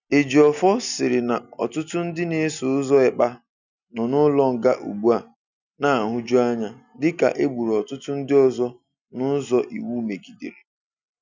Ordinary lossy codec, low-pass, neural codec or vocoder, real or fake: none; 7.2 kHz; none; real